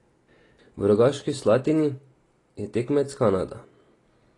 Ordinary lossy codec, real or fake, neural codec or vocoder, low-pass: AAC, 32 kbps; real; none; 10.8 kHz